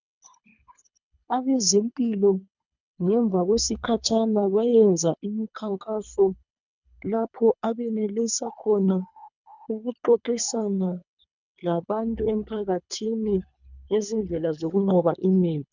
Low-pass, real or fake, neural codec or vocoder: 7.2 kHz; fake; codec, 24 kHz, 3 kbps, HILCodec